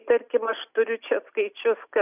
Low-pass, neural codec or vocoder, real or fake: 3.6 kHz; none; real